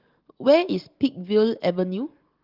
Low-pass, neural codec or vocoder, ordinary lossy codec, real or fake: 5.4 kHz; none; Opus, 16 kbps; real